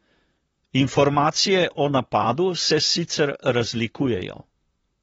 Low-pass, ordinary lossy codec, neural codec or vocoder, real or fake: 19.8 kHz; AAC, 24 kbps; vocoder, 44.1 kHz, 128 mel bands, Pupu-Vocoder; fake